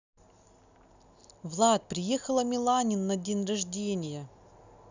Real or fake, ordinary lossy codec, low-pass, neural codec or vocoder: real; none; 7.2 kHz; none